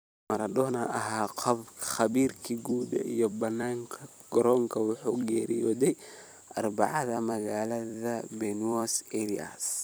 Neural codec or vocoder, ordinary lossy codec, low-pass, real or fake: vocoder, 44.1 kHz, 128 mel bands every 256 samples, BigVGAN v2; none; none; fake